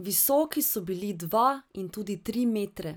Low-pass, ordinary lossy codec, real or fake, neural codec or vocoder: none; none; real; none